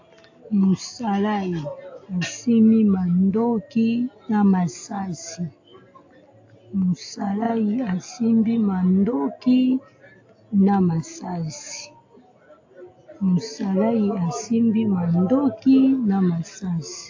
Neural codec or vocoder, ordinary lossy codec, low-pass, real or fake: none; AAC, 48 kbps; 7.2 kHz; real